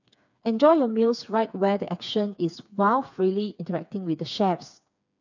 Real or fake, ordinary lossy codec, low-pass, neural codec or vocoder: fake; none; 7.2 kHz; codec, 16 kHz, 4 kbps, FreqCodec, smaller model